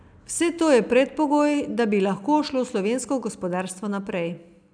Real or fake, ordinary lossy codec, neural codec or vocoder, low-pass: real; none; none; 9.9 kHz